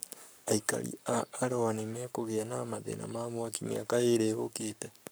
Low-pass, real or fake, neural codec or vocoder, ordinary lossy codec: none; fake; codec, 44.1 kHz, 7.8 kbps, Pupu-Codec; none